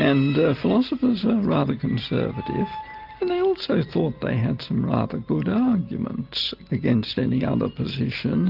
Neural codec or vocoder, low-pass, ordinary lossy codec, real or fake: none; 5.4 kHz; Opus, 32 kbps; real